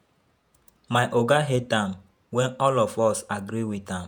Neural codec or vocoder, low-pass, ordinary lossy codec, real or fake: vocoder, 48 kHz, 128 mel bands, Vocos; none; none; fake